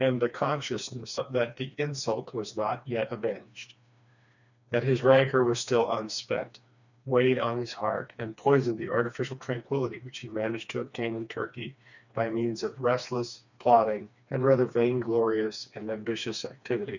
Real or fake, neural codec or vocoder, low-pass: fake; codec, 16 kHz, 2 kbps, FreqCodec, smaller model; 7.2 kHz